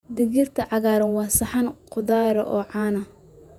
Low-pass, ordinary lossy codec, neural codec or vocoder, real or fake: 19.8 kHz; none; vocoder, 48 kHz, 128 mel bands, Vocos; fake